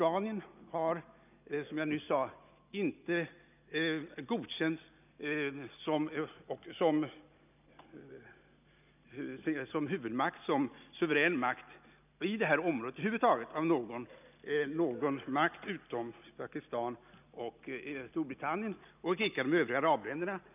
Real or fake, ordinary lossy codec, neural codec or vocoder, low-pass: real; none; none; 3.6 kHz